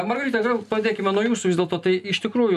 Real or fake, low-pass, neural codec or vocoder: real; 14.4 kHz; none